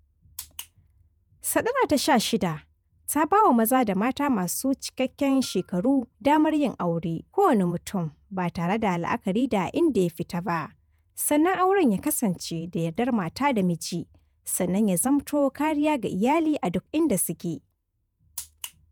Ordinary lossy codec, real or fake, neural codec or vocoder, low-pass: none; fake; vocoder, 48 kHz, 128 mel bands, Vocos; none